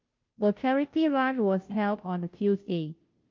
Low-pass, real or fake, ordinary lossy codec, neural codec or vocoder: 7.2 kHz; fake; Opus, 24 kbps; codec, 16 kHz, 0.5 kbps, FunCodec, trained on Chinese and English, 25 frames a second